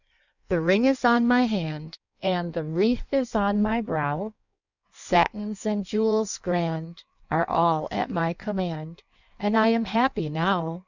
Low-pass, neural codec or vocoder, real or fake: 7.2 kHz; codec, 16 kHz in and 24 kHz out, 1.1 kbps, FireRedTTS-2 codec; fake